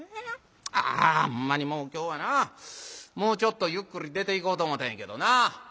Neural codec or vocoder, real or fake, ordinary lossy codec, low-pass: none; real; none; none